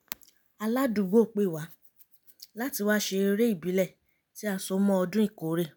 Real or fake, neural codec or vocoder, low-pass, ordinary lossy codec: real; none; none; none